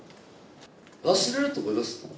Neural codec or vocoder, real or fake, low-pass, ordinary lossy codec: none; real; none; none